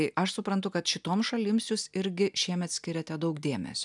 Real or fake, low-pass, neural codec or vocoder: real; 10.8 kHz; none